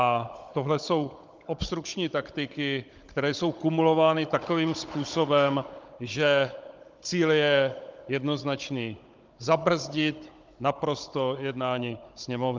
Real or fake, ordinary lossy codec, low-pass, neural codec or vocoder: fake; Opus, 24 kbps; 7.2 kHz; codec, 16 kHz, 16 kbps, FunCodec, trained on Chinese and English, 50 frames a second